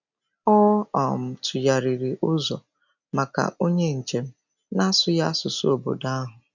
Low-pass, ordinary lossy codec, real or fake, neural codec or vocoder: 7.2 kHz; none; real; none